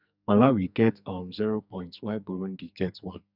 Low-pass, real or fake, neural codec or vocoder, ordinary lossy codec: 5.4 kHz; fake; codec, 44.1 kHz, 2.6 kbps, SNAC; none